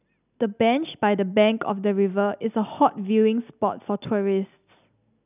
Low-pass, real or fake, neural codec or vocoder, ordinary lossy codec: 3.6 kHz; real; none; none